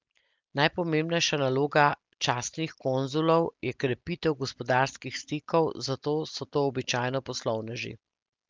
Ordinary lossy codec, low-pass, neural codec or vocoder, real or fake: Opus, 24 kbps; 7.2 kHz; none; real